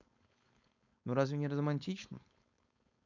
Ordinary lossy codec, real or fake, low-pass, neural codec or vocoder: none; fake; 7.2 kHz; codec, 16 kHz, 4.8 kbps, FACodec